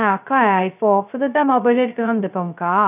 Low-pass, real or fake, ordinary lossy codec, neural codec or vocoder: 3.6 kHz; fake; none; codec, 16 kHz, 0.2 kbps, FocalCodec